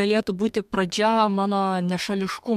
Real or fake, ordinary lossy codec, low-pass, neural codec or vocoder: fake; MP3, 96 kbps; 14.4 kHz; codec, 32 kHz, 1.9 kbps, SNAC